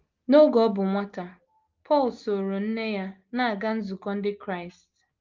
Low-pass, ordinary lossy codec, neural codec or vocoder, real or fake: 7.2 kHz; Opus, 32 kbps; none; real